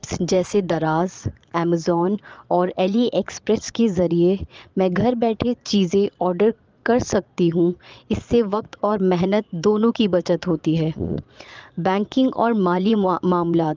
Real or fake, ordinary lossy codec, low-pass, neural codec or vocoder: fake; Opus, 24 kbps; 7.2 kHz; vocoder, 22.05 kHz, 80 mel bands, Vocos